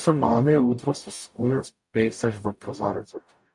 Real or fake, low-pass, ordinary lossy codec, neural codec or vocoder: fake; 10.8 kHz; MP3, 64 kbps; codec, 44.1 kHz, 0.9 kbps, DAC